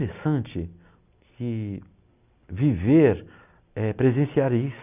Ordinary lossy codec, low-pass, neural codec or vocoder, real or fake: none; 3.6 kHz; none; real